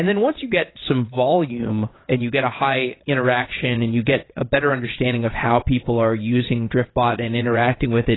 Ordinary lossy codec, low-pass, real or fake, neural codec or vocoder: AAC, 16 kbps; 7.2 kHz; fake; vocoder, 22.05 kHz, 80 mel bands, WaveNeXt